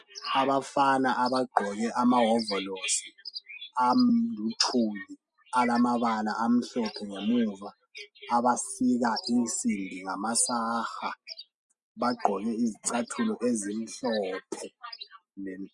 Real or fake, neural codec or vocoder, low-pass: real; none; 10.8 kHz